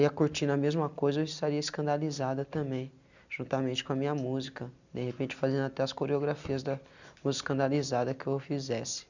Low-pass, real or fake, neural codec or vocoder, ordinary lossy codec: 7.2 kHz; real; none; none